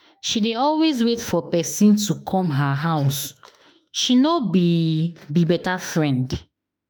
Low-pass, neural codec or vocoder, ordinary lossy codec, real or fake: none; autoencoder, 48 kHz, 32 numbers a frame, DAC-VAE, trained on Japanese speech; none; fake